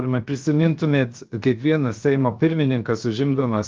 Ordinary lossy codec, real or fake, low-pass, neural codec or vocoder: Opus, 32 kbps; fake; 7.2 kHz; codec, 16 kHz, 0.7 kbps, FocalCodec